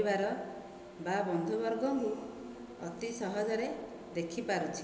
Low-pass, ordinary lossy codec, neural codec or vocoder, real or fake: none; none; none; real